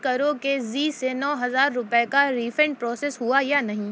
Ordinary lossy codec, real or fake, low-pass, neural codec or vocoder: none; real; none; none